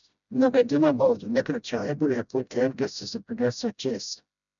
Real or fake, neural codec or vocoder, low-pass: fake; codec, 16 kHz, 0.5 kbps, FreqCodec, smaller model; 7.2 kHz